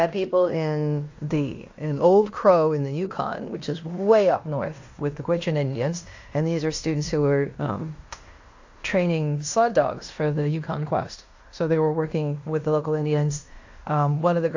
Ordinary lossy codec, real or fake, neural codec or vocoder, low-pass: AAC, 48 kbps; fake; codec, 16 kHz in and 24 kHz out, 0.9 kbps, LongCat-Audio-Codec, fine tuned four codebook decoder; 7.2 kHz